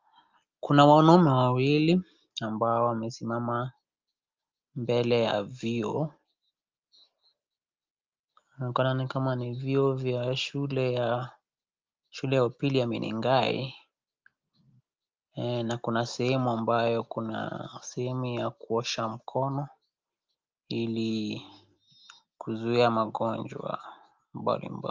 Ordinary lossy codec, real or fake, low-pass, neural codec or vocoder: Opus, 32 kbps; real; 7.2 kHz; none